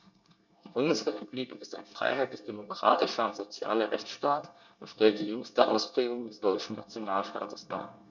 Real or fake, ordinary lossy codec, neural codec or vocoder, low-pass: fake; none; codec, 24 kHz, 1 kbps, SNAC; 7.2 kHz